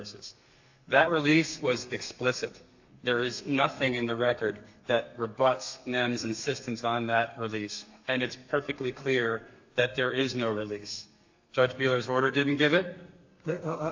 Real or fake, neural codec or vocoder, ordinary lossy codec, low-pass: fake; codec, 32 kHz, 1.9 kbps, SNAC; AAC, 48 kbps; 7.2 kHz